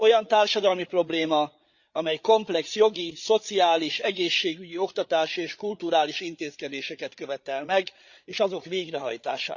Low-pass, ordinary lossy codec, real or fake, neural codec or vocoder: 7.2 kHz; Opus, 64 kbps; fake; codec, 16 kHz, 8 kbps, FreqCodec, larger model